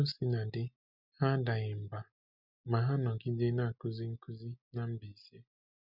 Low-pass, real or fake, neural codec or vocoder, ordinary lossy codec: 5.4 kHz; real; none; none